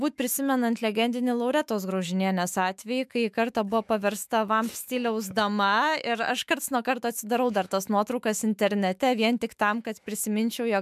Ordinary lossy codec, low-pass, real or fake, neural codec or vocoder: MP3, 96 kbps; 14.4 kHz; fake; autoencoder, 48 kHz, 128 numbers a frame, DAC-VAE, trained on Japanese speech